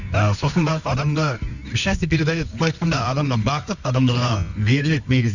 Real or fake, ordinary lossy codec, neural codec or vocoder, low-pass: fake; none; codec, 24 kHz, 0.9 kbps, WavTokenizer, medium music audio release; 7.2 kHz